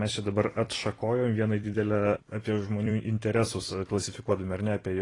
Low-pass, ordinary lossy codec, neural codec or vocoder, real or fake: 10.8 kHz; AAC, 32 kbps; vocoder, 44.1 kHz, 128 mel bands every 256 samples, BigVGAN v2; fake